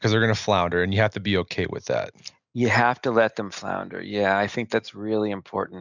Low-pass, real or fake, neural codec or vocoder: 7.2 kHz; real; none